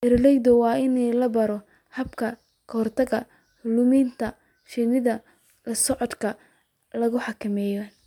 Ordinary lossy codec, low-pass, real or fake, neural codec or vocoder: MP3, 96 kbps; 19.8 kHz; real; none